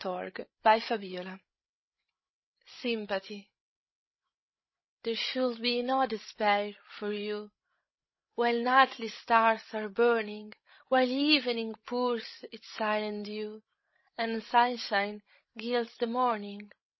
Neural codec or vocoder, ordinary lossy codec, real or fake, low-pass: codec, 16 kHz, 16 kbps, FreqCodec, larger model; MP3, 24 kbps; fake; 7.2 kHz